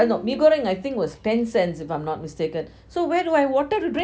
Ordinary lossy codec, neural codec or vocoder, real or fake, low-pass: none; none; real; none